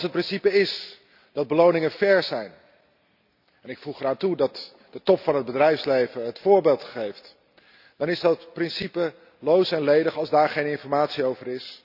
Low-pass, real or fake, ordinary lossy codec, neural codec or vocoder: 5.4 kHz; real; none; none